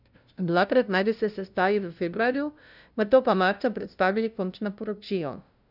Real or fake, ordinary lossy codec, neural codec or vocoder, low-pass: fake; none; codec, 16 kHz, 0.5 kbps, FunCodec, trained on LibriTTS, 25 frames a second; 5.4 kHz